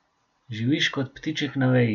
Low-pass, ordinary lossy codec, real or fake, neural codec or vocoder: 7.2 kHz; none; real; none